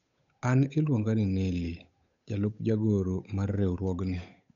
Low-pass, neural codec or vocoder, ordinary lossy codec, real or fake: 7.2 kHz; codec, 16 kHz, 8 kbps, FunCodec, trained on Chinese and English, 25 frames a second; none; fake